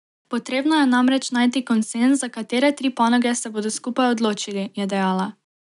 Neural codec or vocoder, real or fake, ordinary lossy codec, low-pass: none; real; none; 10.8 kHz